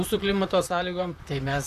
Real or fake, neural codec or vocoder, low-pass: fake; vocoder, 44.1 kHz, 128 mel bands, Pupu-Vocoder; 14.4 kHz